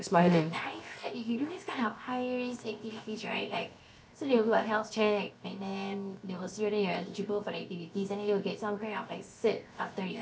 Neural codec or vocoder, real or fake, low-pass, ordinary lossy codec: codec, 16 kHz, 0.7 kbps, FocalCodec; fake; none; none